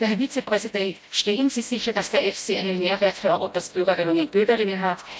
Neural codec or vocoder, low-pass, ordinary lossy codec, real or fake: codec, 16 kHz, 0.5 kbps, FreqCodec, smaller model; none; none; fake